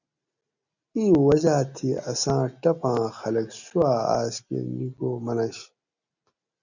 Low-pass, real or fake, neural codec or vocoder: 7.2 kHz; real; none